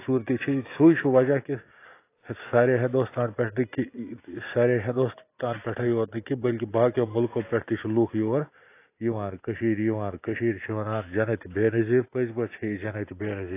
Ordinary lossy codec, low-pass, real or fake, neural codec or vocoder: AAC, 24 kbps; 3.6 kHz; real; none